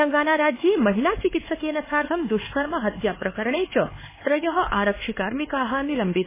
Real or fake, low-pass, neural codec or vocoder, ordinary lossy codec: fake; 3.6 kHz; codec, 16 kHz, 4 kbps, X-Codec, HuBERT features, trained on LibriSpeech; MP3, 16 kbps